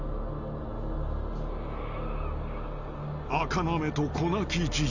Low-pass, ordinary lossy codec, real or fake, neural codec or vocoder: 7.2 kHz; none; real; none